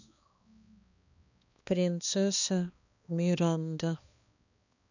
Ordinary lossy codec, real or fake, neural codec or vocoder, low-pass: none; fake; codec, 16 kHz, 2 kbps, X-Codec, HuBERT features, trained on balanced general audio; 7.2 kHz